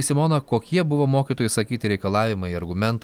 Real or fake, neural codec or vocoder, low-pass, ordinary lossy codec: real; none; 19.8 kHz; Opus, 32 kbps